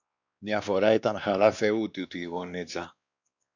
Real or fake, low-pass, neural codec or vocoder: fake; 7.2 kHz; codec, 16 kHz, 2 kbps, X-Codec, WavLM features, trained on Multilingual LibriSpeech